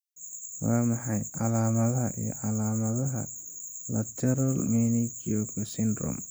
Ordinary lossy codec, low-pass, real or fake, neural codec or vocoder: none; none; real; none